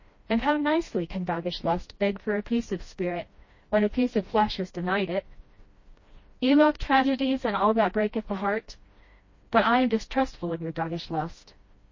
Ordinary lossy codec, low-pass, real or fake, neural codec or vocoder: MP3, 32 kbps; 7.2 kHz; fake; codec, 16 kHz, 1 kbps, FreqCodec, smaller model